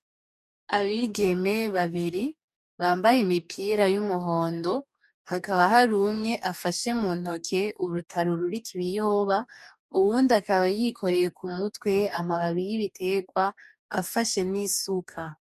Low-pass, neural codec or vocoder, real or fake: 14.4 kHz; codec, 44.1 kHz, 2.6 kbps, DAC; fake